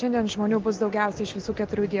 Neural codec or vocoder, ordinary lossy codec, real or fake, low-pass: none; Opus, 16 kbps; real; 7.2 kHz